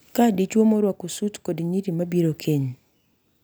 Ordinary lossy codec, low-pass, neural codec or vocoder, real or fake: none; none; none; real